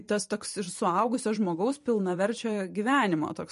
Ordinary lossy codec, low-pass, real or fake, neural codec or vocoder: MP3, 48 kbps; 14.4 kHz; fake; vocoder, 44.1 kHz, 128 mel bands every 512 samples, BigVGAN v2